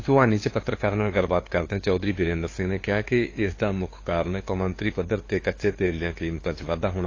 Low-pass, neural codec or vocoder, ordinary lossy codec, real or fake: 7.2 kHz; codec, 16 kHz, 2 kbps, FunCodec, trained on LibriTTS, 25 frames a second; AAC, 32 kbps; fake